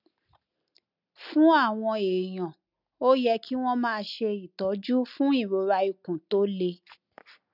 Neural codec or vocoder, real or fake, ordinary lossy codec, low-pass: none; real; none; 5.4 kHz